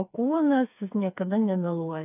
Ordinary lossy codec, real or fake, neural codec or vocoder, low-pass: AAC, 32 kbps; fake; codec, 16 kHz, 4 kbps, FreqCodec, smaller model; 3.6 kHz